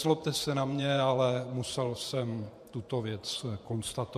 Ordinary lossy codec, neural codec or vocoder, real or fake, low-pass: MP3, 64 kbps; vocoder, 48 kHz, 128 mel bands, Vocos; fake; 14.4 kHz